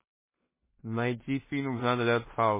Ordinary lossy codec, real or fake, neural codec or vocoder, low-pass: MP3, 16 kbps; fake; codec, 16 kHz in and 24 kHz out, 0.4 kbps, LongCat-Audio-Codec, two codebook decoder; 3.6 kHz